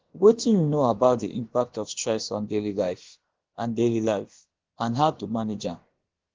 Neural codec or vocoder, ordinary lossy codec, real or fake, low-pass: codec, 16 kHz, about 1 kbps, DyCAST, with the encoder's durations; Opus, 16 kbps; fake; 7.2 kHz